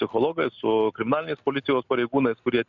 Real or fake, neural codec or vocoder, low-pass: real; none; 7.2 kHz